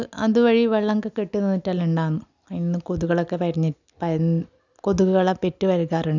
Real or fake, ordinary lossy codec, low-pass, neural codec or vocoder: real; none; 7.2 kHz; none